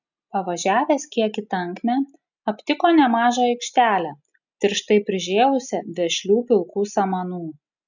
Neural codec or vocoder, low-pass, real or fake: none; 7.2 kHz; real